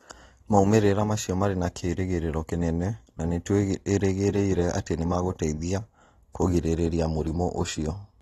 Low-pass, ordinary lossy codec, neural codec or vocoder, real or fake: 19.8 kHz; AAC, 32 kbps; none; real